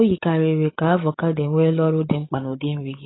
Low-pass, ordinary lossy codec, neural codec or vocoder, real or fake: 7.2 kHz; AAC, 16 kbps; codec, 16 kHz, 16 kbps, FreqCodec, larger model; fake